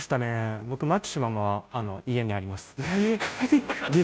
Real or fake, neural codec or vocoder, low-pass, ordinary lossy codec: fake; codec, 16 kHz, 0.5 kbps, FunCodec, trained on Chinese and English, 25 frames a second; none; none